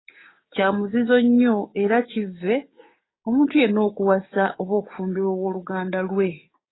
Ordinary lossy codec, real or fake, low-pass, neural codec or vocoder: AAC, 16 kbps; fake; 7.2 kHz; codec, 16 kHz, 6 kbps, DAC